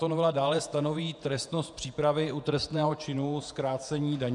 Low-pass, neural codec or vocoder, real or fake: 10.8 kHz; vocoder, 48 kHz, 128 mel bands, Vocos; fake